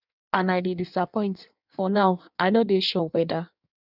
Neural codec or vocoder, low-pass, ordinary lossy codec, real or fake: codec, 16 kHz in and 24 kHz out, 1.1 kbps, FireRedTTS-2 codec; 5.4 kHz; none; fake